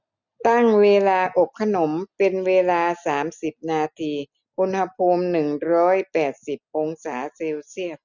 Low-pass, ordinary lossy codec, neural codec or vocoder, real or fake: 7.2 kHz; none; none; real